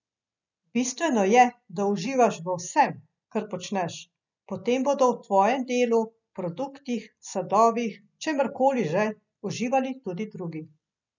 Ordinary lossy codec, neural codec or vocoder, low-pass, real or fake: none; none; 7.2 kHz; real